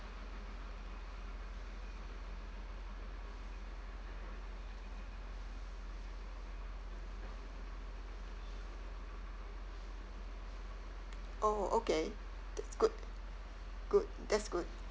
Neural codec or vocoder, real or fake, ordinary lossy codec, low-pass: none; real; none; none